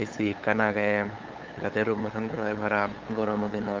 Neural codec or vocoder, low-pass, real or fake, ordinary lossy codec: codec, 16 kHz, 8 kbps, FunCodec, trained on LibriTTS, 25 frames a second; 7.2 kHz; fake; Opus, 24 kbps